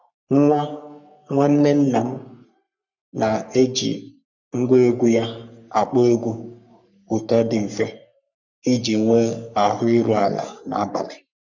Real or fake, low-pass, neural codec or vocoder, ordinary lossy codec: fake; 7.2 kHz; codec, 44.1 kHz, 3.4 kbps, Pupu-Codec; none